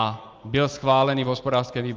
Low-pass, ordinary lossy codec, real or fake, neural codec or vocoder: 7.2 kHz; Opus, 32 kbps; real; none